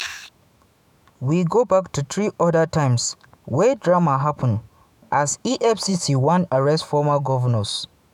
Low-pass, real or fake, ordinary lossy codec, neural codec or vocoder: 19.8 kHz; fake; none; autoencoder, 48 kHz, 128 numbers a frame, DAC-VAE, trained on Japanese speech